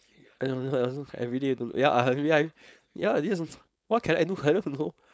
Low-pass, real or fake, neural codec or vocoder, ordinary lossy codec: none; fake; codec, 16 kHz, 4.8 kbps, FACodec; none